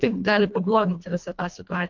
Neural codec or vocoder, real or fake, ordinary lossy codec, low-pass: codec, 24 kHz, 1.5 kbps, HILCodec; fake; MP3, 64 kbps; 7.2 kHz